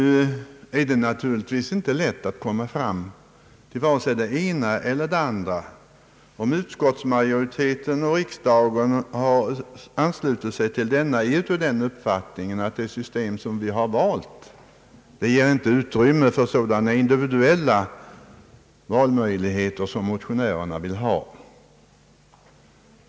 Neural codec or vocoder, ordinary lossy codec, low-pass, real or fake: none; none; none; real